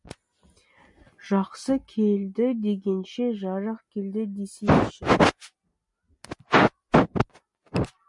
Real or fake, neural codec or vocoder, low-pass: real; none; 10.8 kHz